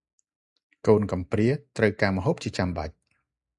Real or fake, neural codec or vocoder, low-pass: real; none; 10.8 kHz